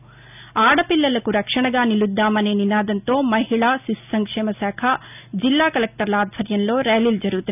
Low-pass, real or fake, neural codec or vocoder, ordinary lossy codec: 3.6 kHz; real; none; none